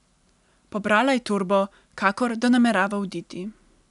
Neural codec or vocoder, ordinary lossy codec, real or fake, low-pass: none; none; real; 10.8 kHz